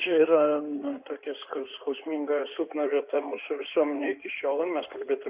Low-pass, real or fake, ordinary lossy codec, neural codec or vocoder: 3.6 kHz; fake; Opus, 64 kbps; codec, 16 kHz in and 24 kHz out, 2.2 kbps, FireRedTTS-2 codec